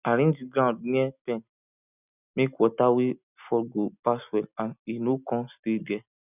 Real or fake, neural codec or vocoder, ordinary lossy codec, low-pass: real; none; none; 3.6 kHz